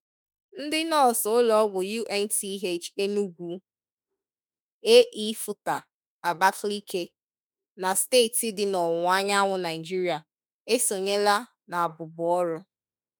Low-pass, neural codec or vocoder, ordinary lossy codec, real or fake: none; autoencoder, 48 kHz, 32 numbers a frame, DAC-VAE, trained on Japanese speech; none; fake